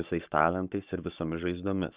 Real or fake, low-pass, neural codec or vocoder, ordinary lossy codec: fake; 3.6 kHz; codec, 44.1 kHz, 7.8 kbps, Pupu-Codec; Opus, 64 kbps